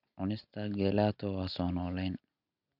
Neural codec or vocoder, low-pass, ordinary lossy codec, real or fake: none; 5.4 kHz; none; real